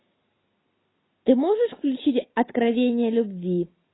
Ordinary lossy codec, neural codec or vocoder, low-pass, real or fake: AAC, 16 kbps; none; 7.2 kHz; real